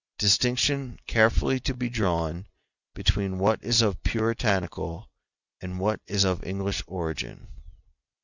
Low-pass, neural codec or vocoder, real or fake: 7.2 kHz; none; real